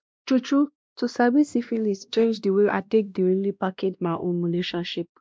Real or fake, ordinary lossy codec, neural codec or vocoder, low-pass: fake; none; codec, 16 kHz, 1 kbps, X-Codec, WavLM features, trained on Multilingual LibriSpeech; none